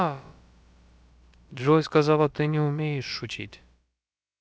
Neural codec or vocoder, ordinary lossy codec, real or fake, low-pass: codec, 16 kHz, about 1 kbps, DyCAST, with the encoder's durations; none; fake; none